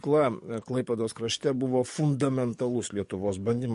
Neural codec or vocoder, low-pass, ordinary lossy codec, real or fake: codec, 44.1 kHz, 7.8 kbps, DAC; 14.4 kHz; MP3, 48 kbps; fake